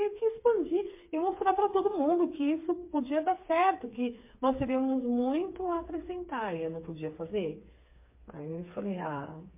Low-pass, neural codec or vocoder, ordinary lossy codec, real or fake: 3.6 kHz; codec, 16 kHz, 4 kbps, FreqCodec, smaller model; MP3, 24 kbps; fake